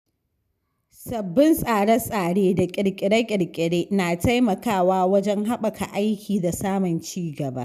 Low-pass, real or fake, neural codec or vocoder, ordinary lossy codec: 14.4 kHz; real; none; none